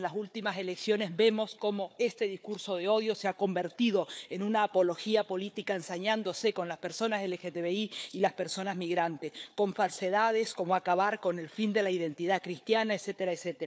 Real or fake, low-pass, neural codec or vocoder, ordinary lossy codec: fake; none; codec, 16 kHz, 4 kbps, FunCodec, trained on Chinese and English, 50 frames a second; none